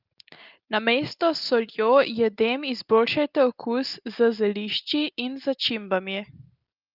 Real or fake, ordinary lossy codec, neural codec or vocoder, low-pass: real; Opus, 32 kbps; none; 5.4 kHz